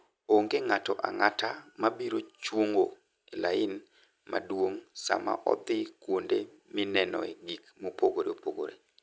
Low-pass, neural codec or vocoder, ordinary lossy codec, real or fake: none; none; none; real